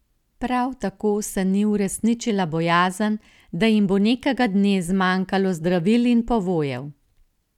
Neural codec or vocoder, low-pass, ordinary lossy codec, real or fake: none; 19.8 kHz; none; real